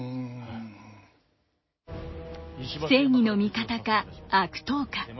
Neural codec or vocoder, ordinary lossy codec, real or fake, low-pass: none; MP3, 24 kbps; real; 7.2 kHz